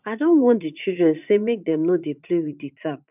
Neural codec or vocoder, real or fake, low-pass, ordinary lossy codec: vocoder, 44.1 kHz, 80 mel bands, Vocos; fake; 3.6 kHz; none